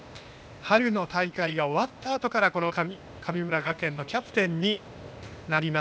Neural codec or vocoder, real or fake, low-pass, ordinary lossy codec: codec, 16 kHz, 0.8 kbps, ZipCodec; fake; none; none